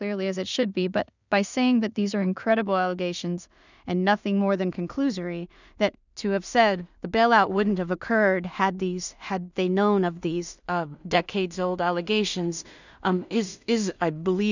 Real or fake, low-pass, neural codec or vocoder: fake; 7.2 kHz; codec, 16 kHz in and 24 kHz out, 0.4 kbps, LongCat-Audio-Codec, two codebook decoder